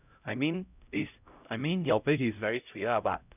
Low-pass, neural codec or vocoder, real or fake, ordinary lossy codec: 3.6 kHz; codec, 16 kHz, 0.5 kbps, X-Codec, HuBERT features, trained on LibriSpeech; fake; none